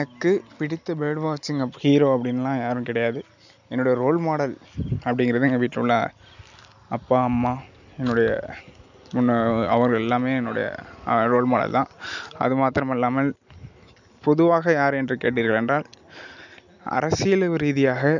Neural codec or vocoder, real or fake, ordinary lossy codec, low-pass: none; real; none; 7.2 kHz